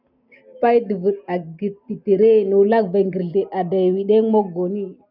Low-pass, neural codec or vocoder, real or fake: 5.4 kHz; none; real